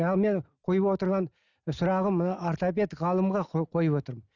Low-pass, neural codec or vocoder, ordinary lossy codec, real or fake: 7.2 kHz; none; none; real